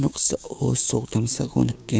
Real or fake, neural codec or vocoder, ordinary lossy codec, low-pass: fake; codec, 16 kHz, 6 kbps, DAC; none; none